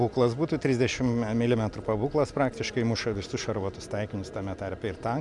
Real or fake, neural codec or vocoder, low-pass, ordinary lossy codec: real; none; 10.8 kHz; MP3, 96 kbps